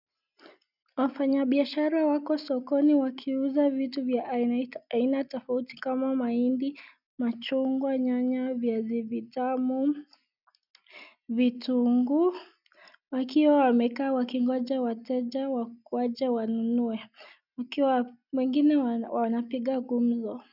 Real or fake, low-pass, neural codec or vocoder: real; 5.4 kHz; none